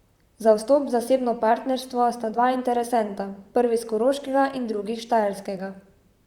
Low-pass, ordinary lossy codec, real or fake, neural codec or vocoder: 19.8 kHz; Opus, 64 kbps; fake; vocoder, 44.1 kHz, 128 mel bands, Pupu-Vocoder